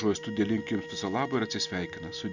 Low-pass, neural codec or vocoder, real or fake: 7.2 kHz; none; real